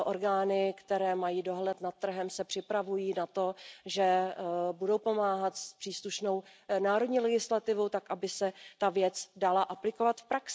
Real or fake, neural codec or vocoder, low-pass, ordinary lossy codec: real; none; none; none